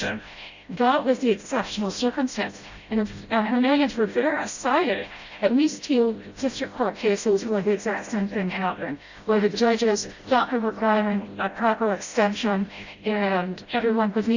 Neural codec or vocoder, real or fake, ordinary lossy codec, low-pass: codec, 16 kHz, 0.5 kbps, FreqCodec, smaller model; fake; Opus, 64 kbps; 7.2 kHz